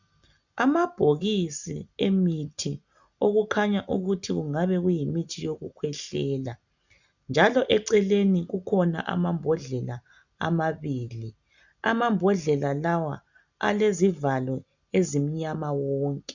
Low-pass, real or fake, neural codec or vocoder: 7.2 kHz; real; none